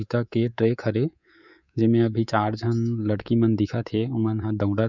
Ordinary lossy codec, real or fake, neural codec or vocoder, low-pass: none; fake; codec, 24 kHz, 3.1 kbps, DualCodec; 7.2 kHz